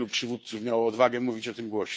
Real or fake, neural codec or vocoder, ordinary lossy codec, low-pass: fake; codec, 16 kHz, 2 kbps, FunCodec, trained on Chinese and English, 25 frames a second; none; none